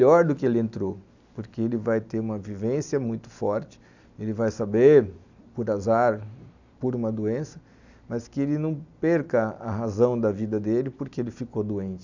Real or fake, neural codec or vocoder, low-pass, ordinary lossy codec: real; none; 7.2 kHz; none